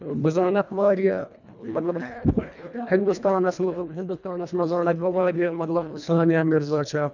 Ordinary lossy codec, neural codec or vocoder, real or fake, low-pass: none; codec, 24 kHz, 1.5 kbps, HILCodec; fake; 7.2 kHz